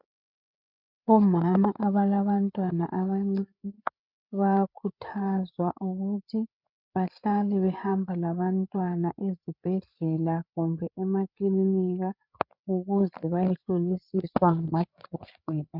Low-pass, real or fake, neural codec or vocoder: 5.4 kHz; fake; codec, 16 kHz, 8 kbps, FreqCodec, larger model